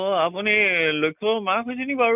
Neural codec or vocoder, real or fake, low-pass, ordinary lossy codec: none; real; 3.6 kHz; none